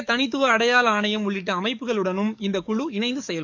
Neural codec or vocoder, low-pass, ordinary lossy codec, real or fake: codec, 44.1 kHz, 7.8 kbps, DAC; 7.2 kHz; none; fake